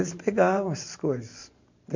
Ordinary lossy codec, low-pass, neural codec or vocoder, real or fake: MP3, 48 kbps; 7.2 kHz; vocoder, 44.1 kHz, 128 mel bands every 512 samples, BigVGAN v2; fake